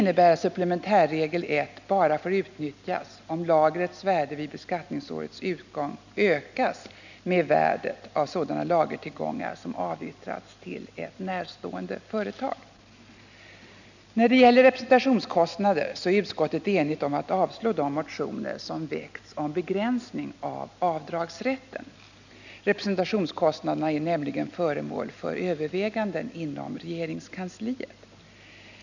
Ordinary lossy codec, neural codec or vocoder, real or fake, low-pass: none; none; real; 7.2 kHz